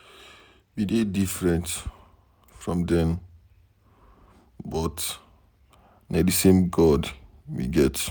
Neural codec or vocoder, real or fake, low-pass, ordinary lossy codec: none; real; none; none